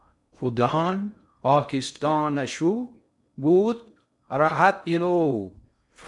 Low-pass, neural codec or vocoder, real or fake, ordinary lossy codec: 10.8 kHz; codec, 16 kHz in and 24 kHz out, 0.6 kbps, FocalCodec, streaming, 2048 codes; fake; MP3, 96 kbps